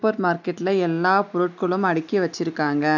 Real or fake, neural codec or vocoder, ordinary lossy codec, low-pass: real; none; none; 7.2 kHz